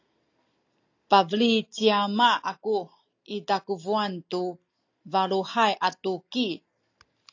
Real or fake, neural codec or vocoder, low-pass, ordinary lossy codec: real; none; 7.2 kHz; AAC, 48 kbps